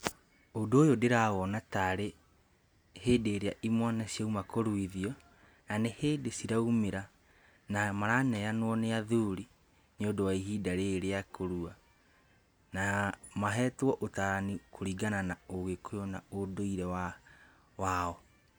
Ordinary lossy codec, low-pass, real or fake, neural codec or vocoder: none; none; real; none